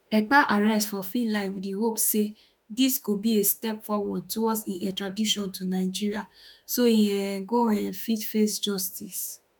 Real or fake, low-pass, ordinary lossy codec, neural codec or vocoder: fake; none; none; autoencoder, 48 kHz, 32 numbers a frame, DAC-VAE, trained on Japanese speech